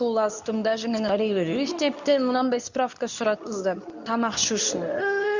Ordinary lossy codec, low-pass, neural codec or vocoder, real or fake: none; 7.2 kHz; codec, 24 kHz, 0.9 kbps, WavTokenizer, medium speech release version 2; fake